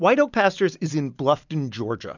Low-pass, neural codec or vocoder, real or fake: 7.2 kHz; none; real